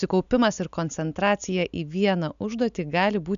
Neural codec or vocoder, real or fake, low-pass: none; real; 7.2 kHz